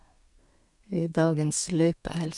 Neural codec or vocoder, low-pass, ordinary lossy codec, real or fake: codec, 32 kHz, 1.9 kbps, SNAC; 10.8 kHz; none; fake